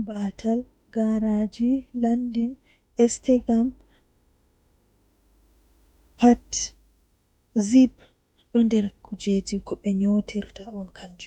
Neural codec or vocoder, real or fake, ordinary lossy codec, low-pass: autoencoder, 48 kHz, 32 numbers a frame, DAC-VAE, trained on Japanese speech; fake; none; 19.8 kHz